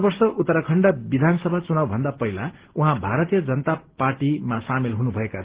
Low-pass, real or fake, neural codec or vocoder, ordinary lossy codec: 3.6 kHz; real; none; Opus, 16 kbps